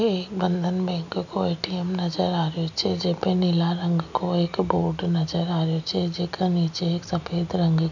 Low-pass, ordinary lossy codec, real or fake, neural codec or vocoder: 7.2 kHz; none; real; none